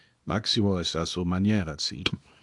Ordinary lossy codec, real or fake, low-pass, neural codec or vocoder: AAC, 64 kbps; fake; 10.8 kHz; codec, 24 kHz, 0.9 kbps, WavTokenizer, small release